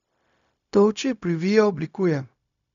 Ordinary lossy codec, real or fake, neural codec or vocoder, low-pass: none; fake; codec, 16 kHz, 0.4 kbps, LongCat-Audio-Codec; 7.2 kHz